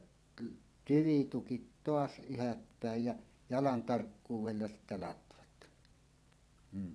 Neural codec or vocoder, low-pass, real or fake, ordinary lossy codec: vocoder, 22.05 kHz, 80 mel bands, WaveNeXt; none; fake; none